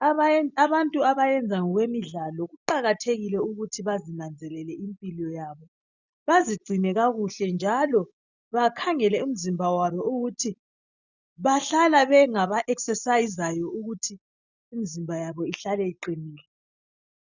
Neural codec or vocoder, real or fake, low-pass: none; real; 7.2 kHz